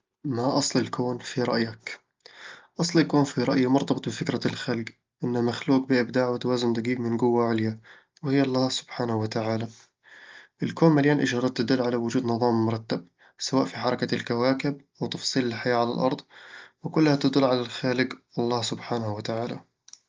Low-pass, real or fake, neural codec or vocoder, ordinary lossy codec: 7.2 kHz; real; none; Opus, 32 kbps